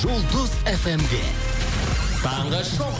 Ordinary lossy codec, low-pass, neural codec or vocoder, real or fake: none; none; none; real